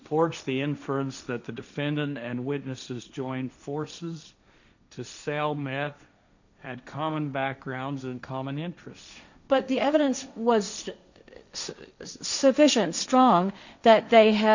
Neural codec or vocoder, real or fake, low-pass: codec, 16 kHz, 1.1 kbps, Voila-Tokenizer; fake; 7.2 kHz